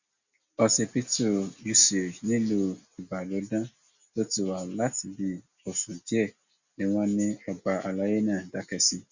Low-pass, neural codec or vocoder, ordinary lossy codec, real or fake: 7.2 kHz; none; Opus, 64 kbps; real